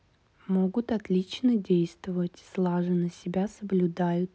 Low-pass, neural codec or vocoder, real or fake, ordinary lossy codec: none; none; real; none